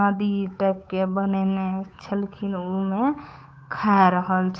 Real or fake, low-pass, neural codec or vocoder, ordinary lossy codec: fake; none; codec, 16 kHz, 8 kbps, FunCodec, trained on Chinese and English, 25 frames a second; none